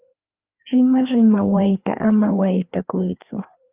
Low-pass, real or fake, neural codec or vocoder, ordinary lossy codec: 3.6 kHz; fake; codec, 16 kHz, 2 kbps, FreqCodec, larger model; Opus, 24 kbps